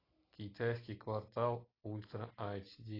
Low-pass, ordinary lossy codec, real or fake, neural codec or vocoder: 5.4 kHz; AAC, 24 kbps; real; none